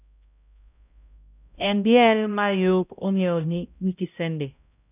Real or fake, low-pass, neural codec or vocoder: fake; 3.6 kHz; codec, 16 kHz, 0.5 kbps, X-Codec, HuBERT features, trained on balanced general audio